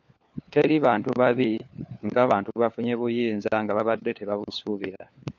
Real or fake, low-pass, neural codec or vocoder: fake; 7.2 kHz; codec, 16 kHz, 4 kbps, FunCodec, trained on LibriTTS, 50 frames a second